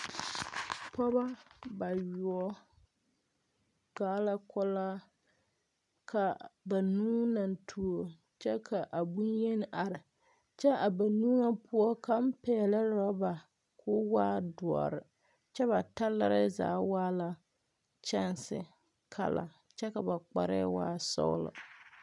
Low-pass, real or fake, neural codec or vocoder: 10.8 kHz; real; none